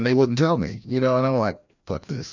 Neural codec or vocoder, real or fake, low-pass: codec, 16 kHz, 1 kbps, FreqCodec, larger model; fake; 7.2 kHz